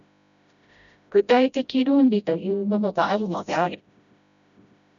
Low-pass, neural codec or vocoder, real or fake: 7.2 kHz; codec, 16 kHz, 0.5 kbps, FreqCodec, smaller model; fake